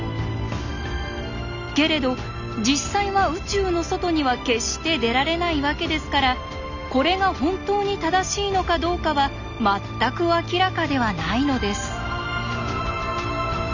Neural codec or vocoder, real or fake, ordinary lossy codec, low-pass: none; real; none; 7.2 kHz